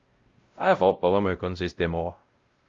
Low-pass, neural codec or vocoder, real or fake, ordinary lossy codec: 7.2 kHz; codec, 16 kHz, 0.5 kbps, X-Codec, WavLM features, trained on Multilingual LibriSpeech; fake; Opus, 32 kbps